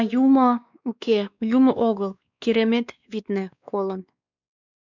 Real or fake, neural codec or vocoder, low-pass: fake; codec, 16 kHz, 2 kbps, X-Codec, WavLM features, trained on Multilingual LibriSpeech; 7.2 kHz